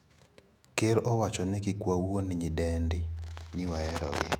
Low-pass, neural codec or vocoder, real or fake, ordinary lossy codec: 19.8 kHz; autoencoder, 48 kHz, 128 numbers a frame, DAC-VAE, trained on Japanese speech; fake; none